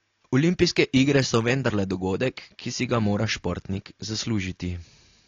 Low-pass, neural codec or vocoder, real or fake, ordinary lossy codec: 7.2 kHz; none; real; AAC, 32 kbps